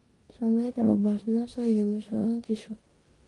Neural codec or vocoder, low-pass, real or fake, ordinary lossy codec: codec, 16 kHz in and 24 kHz out, 0.9 kbps, LongCat-Audio-Codec, four codebook decoder; 10.8 kHz; fake; Opus, 24 kbps